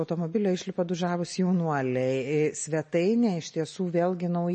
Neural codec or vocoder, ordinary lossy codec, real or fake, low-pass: none; MP3, 32 kbps; real; 10.8 kHz